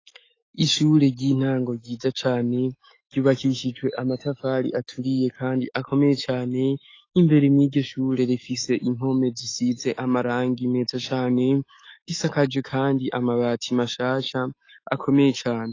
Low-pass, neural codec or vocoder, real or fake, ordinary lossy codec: 7.2 kHz; autoencoder, 48 kHz, 128 numbers a frame, DAC-VAE, trained on Japanese speech; fake; AAC, 32 kbps